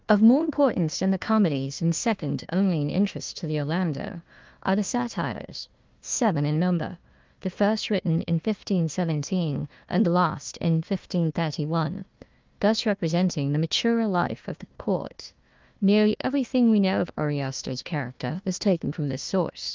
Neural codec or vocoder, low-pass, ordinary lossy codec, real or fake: codec, 16 kHz, 1 kbps, FunCodec, trained on Chinese and English, 50 frames a second; 7.2 kHz; Opus, 32 kbps; fake